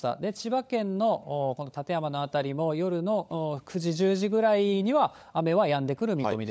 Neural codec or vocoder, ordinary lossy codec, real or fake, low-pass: codec, 16 kHz, 16 kbps, FunCodec, trained on LibriTTS, 50 frames a second; none; fake; none